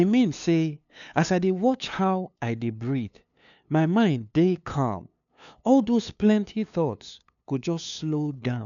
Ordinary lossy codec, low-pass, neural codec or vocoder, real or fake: none; 7.2 kHz; codec, 16 kHz, 2 kbps, FunCodec, trained on LibriTTS, 25 frames a second; fake